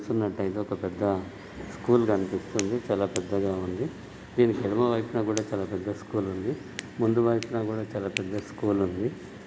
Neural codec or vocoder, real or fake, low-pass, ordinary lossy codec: none; real; none; none